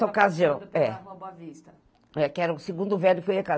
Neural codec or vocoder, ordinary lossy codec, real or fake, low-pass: none; none; real; none